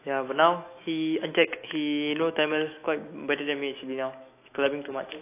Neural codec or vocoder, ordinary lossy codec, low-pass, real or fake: autoencoder, 48 kHz, 128 numbers a frame, DAC-VAE, trained on Japanese speech; AAC, 24 kbps; 3.6 kHz; fake